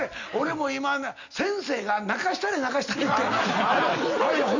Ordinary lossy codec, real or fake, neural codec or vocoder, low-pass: none; real; none; 7.2 kHz